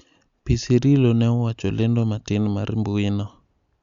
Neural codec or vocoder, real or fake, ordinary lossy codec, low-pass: none; real; Opus, 64 kbps; 7.2 kHz